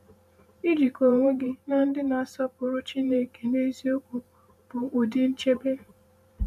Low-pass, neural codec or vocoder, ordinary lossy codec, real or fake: 14.4 kHz; vocoder, 44.1 kHz, 128 mel bands every 512 samples, BigVGAN v2; none; fake